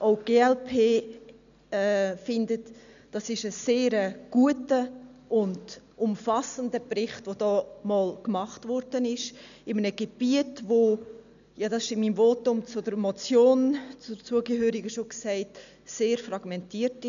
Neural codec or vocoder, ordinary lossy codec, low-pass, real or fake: none; none; 7.2 kHz; real